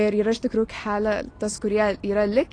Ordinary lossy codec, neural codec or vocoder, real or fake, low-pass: AAC, 32 kbps; none; real; 9.9 kHz